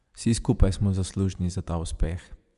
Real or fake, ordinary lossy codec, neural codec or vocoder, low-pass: fake; MP3, 96 kbps; vocoder, 24 kHz, 100 mel bands, Vocos; 10.8 kHz